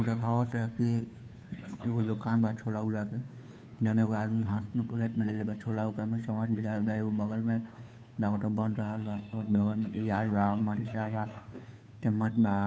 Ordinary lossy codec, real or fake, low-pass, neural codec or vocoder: none; fake; none; codec, 16 kHz, 2 kbps, FunCodec, trained on Chinese and English, 25 frames a second